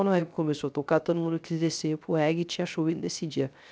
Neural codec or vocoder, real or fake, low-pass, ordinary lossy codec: codec, 16 kHz, 0.3 kbps, FocalCodec; fake; none; none